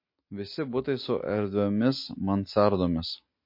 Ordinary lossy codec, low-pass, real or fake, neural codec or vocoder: MP3, 32 kbps; 5.4 kHz; real; none